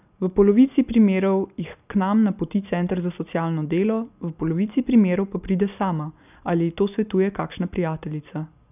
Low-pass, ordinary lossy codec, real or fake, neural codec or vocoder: 3.6 kHz; none; real; none